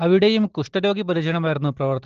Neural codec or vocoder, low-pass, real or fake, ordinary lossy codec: none; 7.2 kHz; real; Opus, 16 kbps